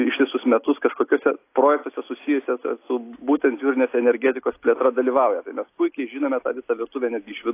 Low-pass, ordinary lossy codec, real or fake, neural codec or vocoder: 3.6 kHz; AAC, 24 kbps; real; none